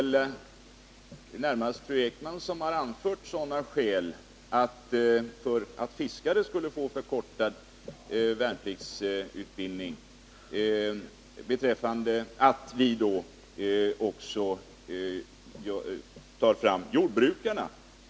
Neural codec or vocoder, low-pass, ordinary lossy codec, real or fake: none; none; none; real